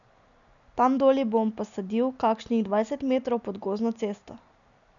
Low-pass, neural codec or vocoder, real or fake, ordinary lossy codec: 7.2 kHz; none; real; none